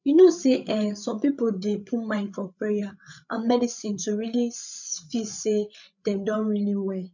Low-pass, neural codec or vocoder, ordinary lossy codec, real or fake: 7.2 kHz; codec, 16 kHz, 8 kbps, FreqCodec, larger model; none; fake